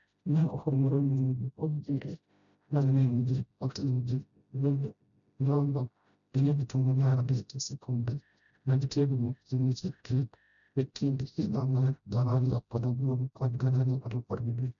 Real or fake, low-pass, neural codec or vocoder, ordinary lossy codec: fake; 7.2 kHz; codec, 16 kHz, 0.5 kbps, FreqCodec, smaller model; MP3, 96 kbps